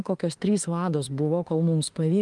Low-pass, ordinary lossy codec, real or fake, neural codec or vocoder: 10.8 kHz; Opus, 24 kbps; fake; autoencoder, 48 kHz, 32 numbers a frame, DAC-VAE, trained on Japanese speech